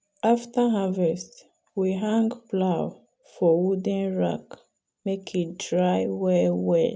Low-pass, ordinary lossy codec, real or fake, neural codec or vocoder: none; none; real; none